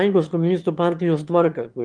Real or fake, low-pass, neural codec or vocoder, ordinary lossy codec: fake; 9.9 kHz; autoencoder, 22.05 kHz, a latent of 192 numbers a frame, VITS, trained on one speaker; Opus, 24 kbps